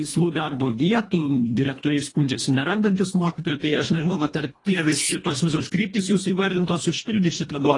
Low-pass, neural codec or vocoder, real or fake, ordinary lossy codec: 10.8 kHz; codec, 24 kHz, 1.5 kbps, HILCodec; fake; AAC, 32 kbps